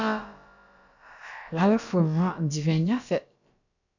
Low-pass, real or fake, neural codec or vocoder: 7.2 kHz; fake; codec, 16 kHz, about 1 kbps, DyCAST, with the encoder's durations